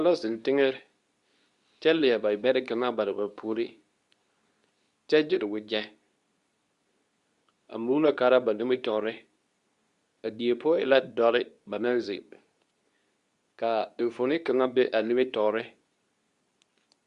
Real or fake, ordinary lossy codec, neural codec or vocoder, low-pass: fake; Opus, 64 kbps; codec, 24 kHz, 0.9 kbps, WavTokenizer, medium speech release version 2; 10.8 kHz